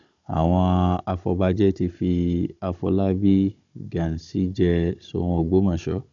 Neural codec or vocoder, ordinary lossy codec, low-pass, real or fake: codec, 16 kHz, 16 kbps, FunCodec, trained on Chinese and English, 50 frames a second; none; 7.2 kHz; fake